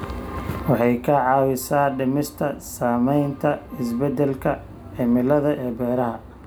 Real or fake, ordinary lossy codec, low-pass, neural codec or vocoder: real; none; none; none